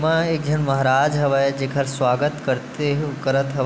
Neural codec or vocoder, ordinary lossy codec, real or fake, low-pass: none; none; real; none